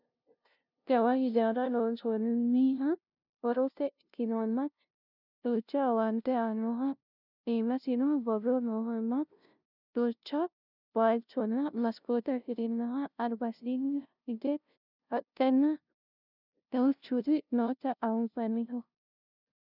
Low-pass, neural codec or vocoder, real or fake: 5.4 kHz; codec, 16 kHz, 0.5 kbps, FunCodec, trained on LibriTTS, 25 frames a second; fake